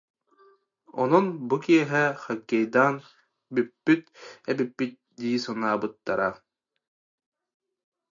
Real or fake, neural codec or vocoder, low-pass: real; none; 7.2 kHz